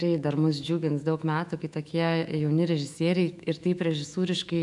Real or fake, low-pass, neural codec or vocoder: fake; 10.8 kHz; autoencoder, 48 kHz, 128 numbers a frame, DAC-VAE, trained on Japanese speech